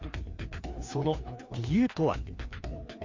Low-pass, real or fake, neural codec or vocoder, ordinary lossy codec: 7.2 kHz; fake; codec, 16 kHz, 2 kbps, FreqCodec, larger model; MP3, 48 kbps